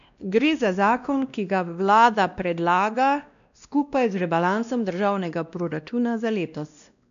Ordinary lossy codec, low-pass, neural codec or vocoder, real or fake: none; 7.2 kHz; codec, 16 kHz, 1 kbps, X-Codec, WavLM features, trained on Multilingual LibriSpeech; fake